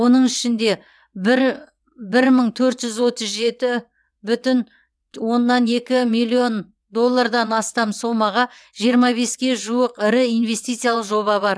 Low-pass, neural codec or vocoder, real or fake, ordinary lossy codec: none; vocoder, 22.05 kHz, 80 mel bands, WaveNeXt; fake; none